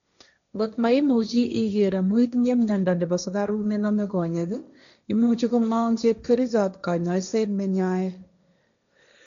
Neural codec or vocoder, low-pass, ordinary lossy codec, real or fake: codec, 16 kHz, 1.1 kbps, Voila-Tokenizer; 7.2 kHz; none; fake